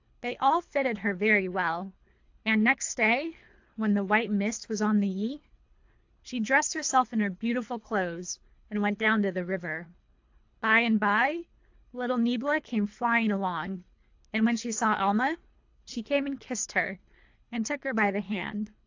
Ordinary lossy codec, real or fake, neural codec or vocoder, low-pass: AAC, 48 kbps; fake; codec, 24 kHz, 3 kbps, HILCodec; 7.2 kHz